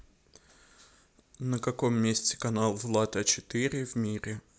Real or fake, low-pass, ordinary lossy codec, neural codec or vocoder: real; none; none; none